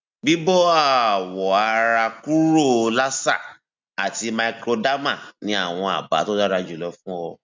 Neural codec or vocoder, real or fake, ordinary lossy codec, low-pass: none; real; MP3, 64 kbps; 7.2 kHz